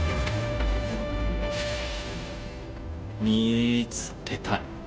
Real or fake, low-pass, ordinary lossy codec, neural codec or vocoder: fake; none; none; codec, 16 kHz, 0.5 kbps, FunCodec, trained on Chinese and English, 25 frames a second